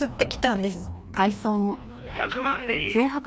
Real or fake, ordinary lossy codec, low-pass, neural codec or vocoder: fake; none; none; codec, 16 kHz, 1 kbps, FreqCodec, larger model